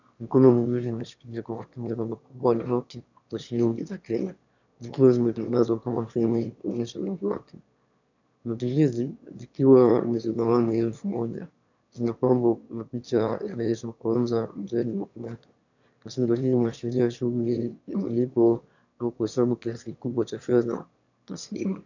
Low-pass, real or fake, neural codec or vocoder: 7.2 kHz; fake; autoencoder, 22.05 kHz, a latent of 192 numbers a frame, VITS, trained on one speaker